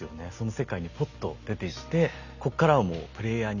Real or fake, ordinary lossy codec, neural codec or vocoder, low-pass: real; none; none; 7.2 kHz